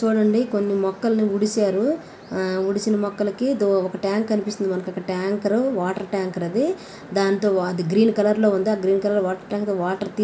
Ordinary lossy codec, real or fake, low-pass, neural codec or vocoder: none; real; none; none